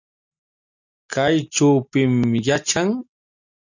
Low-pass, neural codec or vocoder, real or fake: 7.2 kHz; none; real